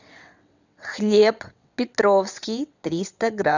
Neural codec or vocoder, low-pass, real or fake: vocoder, 22.05 kHz, 80 mel bands, WaveNeXt; 7.2 kHz; fake